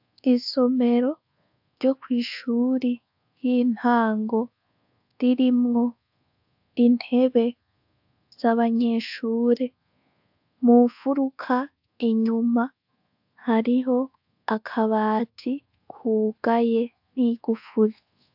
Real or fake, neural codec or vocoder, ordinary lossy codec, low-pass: fake; codec, 24 kHz, 1.2 kbps, DualCodec; AAC, 48 kbps; 5.4 kHz